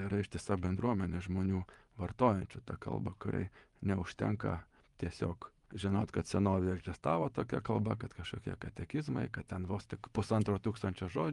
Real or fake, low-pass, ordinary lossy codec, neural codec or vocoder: fake; 10.8 kHz; Opus, 32 kbps; vocoder, 24 kHz, 100 mel bands, Vocos